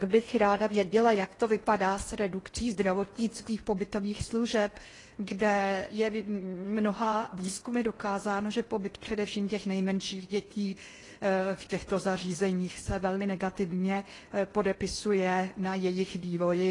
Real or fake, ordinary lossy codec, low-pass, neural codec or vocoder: fake; AAC, 32 kbps; 10.8 kHz; codec, 16 kHz in and 24 kHz out, 0.6 kbps, FocalCodec, streaming, 4096 codes